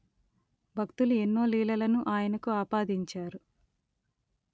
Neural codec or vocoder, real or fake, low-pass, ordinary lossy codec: none; real; none; none